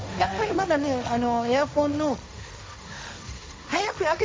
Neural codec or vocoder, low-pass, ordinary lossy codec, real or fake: codec, 16 kHz, 1.1 kbps, Voila-Tokenizer; none; none; fake